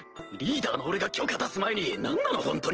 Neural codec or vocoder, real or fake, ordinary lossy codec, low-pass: none; real; Opus, 16 kbps; 7.2 kHz